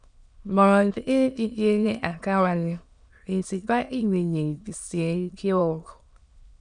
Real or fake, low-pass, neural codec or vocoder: fake; 9.9 kHz; autoencoder, 22.05 kHz, a latent of 192 numbers a frame, VITS, trained on many speakers